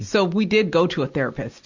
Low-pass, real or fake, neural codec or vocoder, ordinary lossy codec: 7.2 kHz; real; none; Opus, 64 kbps